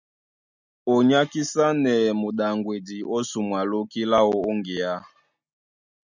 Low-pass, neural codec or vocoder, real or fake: 7.2 kHz; none; real